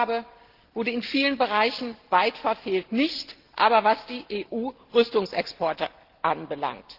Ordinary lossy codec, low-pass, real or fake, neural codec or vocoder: Opus, 16 kbps; 5.4 kHz; real; none